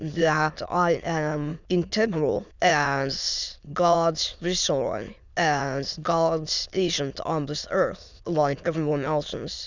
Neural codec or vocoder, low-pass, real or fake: autoencoder, 22.05 kHz, a latent of 192 numbers a frame, VITS, trained on many speakers; 7.2 kHz; fake